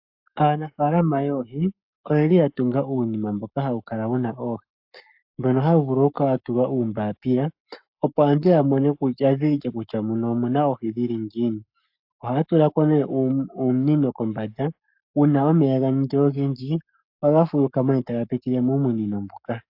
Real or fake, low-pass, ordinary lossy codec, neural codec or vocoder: fake; 5.4 kHz; AAC, 48 kbps; codec, 44.1 kHz, 7.8 kbps, Pupu-Codec